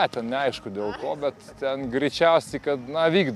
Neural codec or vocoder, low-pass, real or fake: none; 14.4 kHz; real